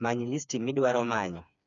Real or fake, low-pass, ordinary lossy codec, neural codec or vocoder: fake; 7.2 kHz; none; codec, 16 kHz, 4 kbps, FreqCodec, smaller model